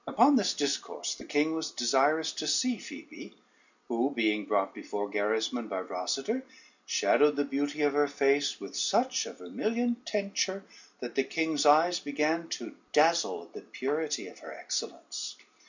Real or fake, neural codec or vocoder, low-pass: real; none; 7.2 kHz